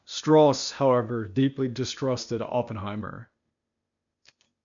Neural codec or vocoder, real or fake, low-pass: codec, 16 kHz, 0.8 kbps, ZipCodec; fake; 7.2 kHz